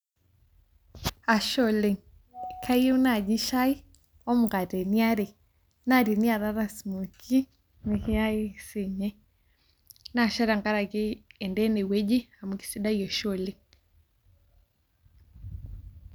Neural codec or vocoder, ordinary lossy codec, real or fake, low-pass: none; none; real; none